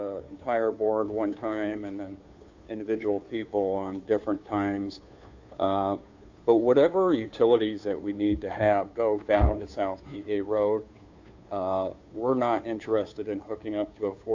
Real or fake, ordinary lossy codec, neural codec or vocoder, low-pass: fake; AAC, 48 kbps; codec, 16 kHz, 2 kbps, FunCodec, trained on Chinese and English, 25 frames a second; 7.2 kHz